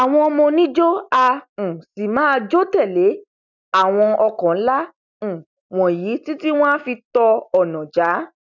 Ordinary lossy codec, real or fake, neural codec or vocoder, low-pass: none; real; none; 7.2 kHz